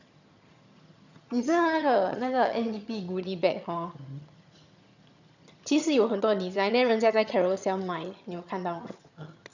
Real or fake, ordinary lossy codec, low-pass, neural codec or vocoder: fake; none; 7.2 kHz; vocoder, 22.05 kHz, 80 mel bands, HiFi-GAN